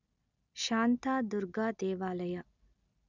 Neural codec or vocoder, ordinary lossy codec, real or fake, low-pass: none; none; real; 7.2 kHz